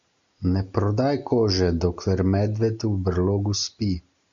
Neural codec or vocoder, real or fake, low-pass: none; real; 7.2 kHz